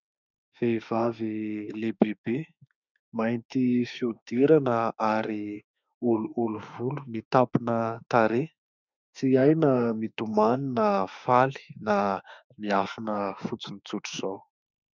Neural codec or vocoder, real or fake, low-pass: autoencoder, 48 kHz, 32 numbers a frame, DAC-VAE, trained on Japanese speech; fake; 7.2 kHz